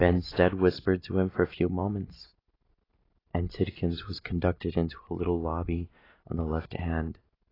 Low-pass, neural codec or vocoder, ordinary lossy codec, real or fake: 5.4 kHz; none; AAC, 24 kbps; real